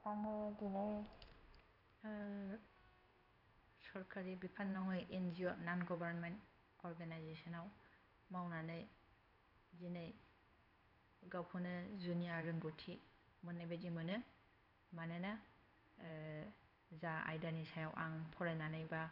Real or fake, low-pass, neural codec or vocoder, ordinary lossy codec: fake; 5.4 kHz; codec, 16 kHz in and 24 kHz out, 1 kbps, XY-Tokenizer; none